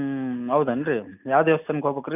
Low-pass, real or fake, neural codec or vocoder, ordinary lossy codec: 3.6 kHz; real; none; none